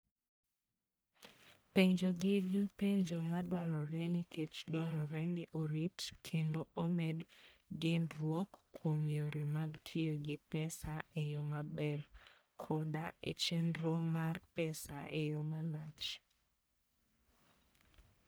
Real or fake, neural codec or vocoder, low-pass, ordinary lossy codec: fake; codec, 44.1 kHz, 1.7 kbps, Pupu-Codec; none; none